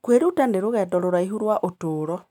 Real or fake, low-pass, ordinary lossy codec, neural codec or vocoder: real; 19.8 kHz; none; none